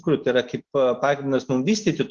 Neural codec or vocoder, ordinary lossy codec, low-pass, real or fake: none; Opus, 16 kbps; 7.2 kHz; real